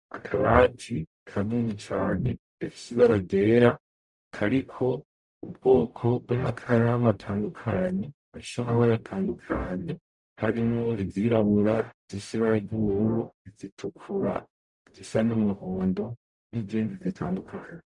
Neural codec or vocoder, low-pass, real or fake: codec, 44.1 kHz, 0.9 kbps, DAC; 10.8 kHz; fake